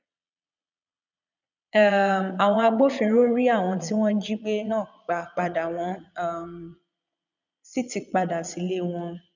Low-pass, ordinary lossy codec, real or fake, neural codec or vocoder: 7.2 kHz; none; fake; vocoder, 22.05 kHz, 80 mel bands, Vocos